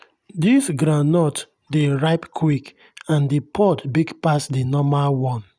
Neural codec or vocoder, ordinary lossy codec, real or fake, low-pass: none; none; real; 9.9 kHz